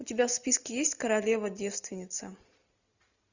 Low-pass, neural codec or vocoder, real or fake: 7.2 kHz; none; real